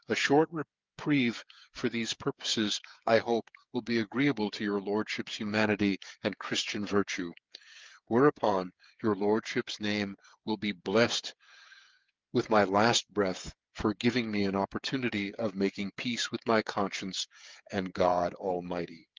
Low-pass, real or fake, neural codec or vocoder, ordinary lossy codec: 7.2 kHz; fake; codec, 16 kHz, 8 kbps, FreqCodec, smaller model; Opus, 32 kbps